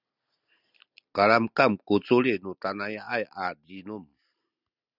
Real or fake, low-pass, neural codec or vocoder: real; 5.4 kHz; none